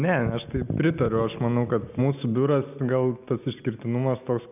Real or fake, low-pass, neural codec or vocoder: real; 3.6 kHz; none